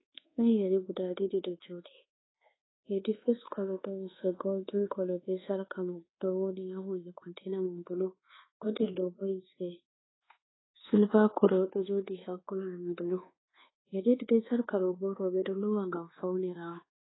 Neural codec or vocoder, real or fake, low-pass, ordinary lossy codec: codec, 24 kHz, 1.2 kbps, DualCodec; fake; 7.2 kHz; AAC, 16 kbps